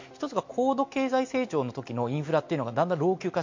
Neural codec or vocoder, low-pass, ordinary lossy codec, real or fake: none; 7.2 kHz; MP3, 48 kbps; real